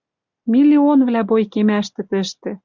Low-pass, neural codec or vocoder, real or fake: 7.2 kHz; none; real